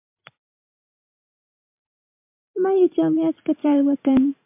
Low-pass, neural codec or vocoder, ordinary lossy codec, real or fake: 3.6 kHz; vocoder, 44.1 kHz, 80 mel bands, Vocos; MP3, 32 kbps; fake